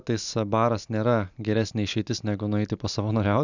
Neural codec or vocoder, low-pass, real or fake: none; 7.2 kHz; real